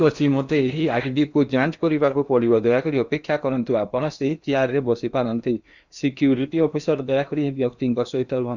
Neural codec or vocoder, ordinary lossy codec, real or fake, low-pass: codec, 16 kHz in and 24 kHz out, 0.8 kbps, FocalCodec, streaming, 65536 codes; Opus, 64 kbps; fake; 7.2 kHz